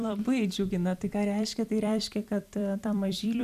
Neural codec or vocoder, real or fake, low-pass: vocoder, 44.1 kHz, 128 mel bands every 256 samples, BigVGAN v2; fake; 14.4 kHz